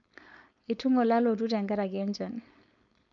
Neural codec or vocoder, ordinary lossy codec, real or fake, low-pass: codec, 16 kHz, 4.8 kbps, FACodec; none; fake; 7.2 kHz